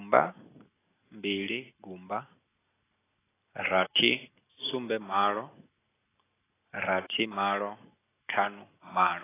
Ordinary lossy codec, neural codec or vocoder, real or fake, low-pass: AAC, 16 kbps; none; real; 3.6 kHz